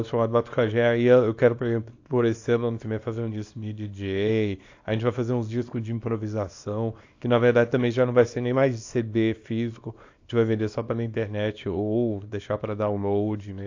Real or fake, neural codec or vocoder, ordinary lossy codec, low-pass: fake; codec, 24 kHz, 0.9 kbps, WavTokenizer, small release; AAC, 48 kbps; 7.2 kHz